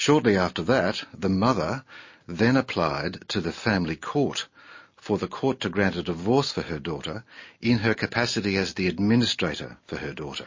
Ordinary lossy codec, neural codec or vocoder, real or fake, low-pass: MP3, 32 kbps; none; real; 7.2 kHz